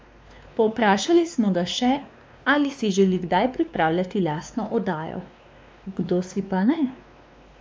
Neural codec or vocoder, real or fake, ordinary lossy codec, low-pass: codec, 16 kHz, 2 kbps, X-Codec, WavLM features, trained on Multilingual LibriSpeech; fake; none; none